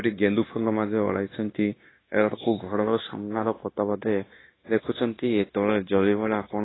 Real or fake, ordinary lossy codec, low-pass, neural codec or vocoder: fake; AAC, 16 kbps; 7.2 kHz; codec, 16 kHz, 0.9 kbps, LongCat-Audio-Codec